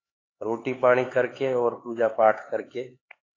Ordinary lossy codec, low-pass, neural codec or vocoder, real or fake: AAC, 32 kbps; 7.2 kHz; codec, 16 kHz, 4 kbps, X-Codec, HuBERT features, trained on LibriSpeech; fake